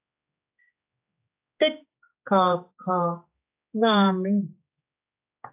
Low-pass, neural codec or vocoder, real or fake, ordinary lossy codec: 3.6 kHz; codec, 16 kHz, 4 kbps, X-Codec, HuBERT features, trained on general audio; fake; AAC, 32 kbps